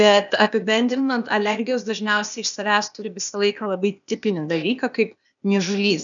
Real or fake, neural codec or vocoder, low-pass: fake; codec, 16 kHz, 0.8 kbps, ZipCodec; 7.2 kHz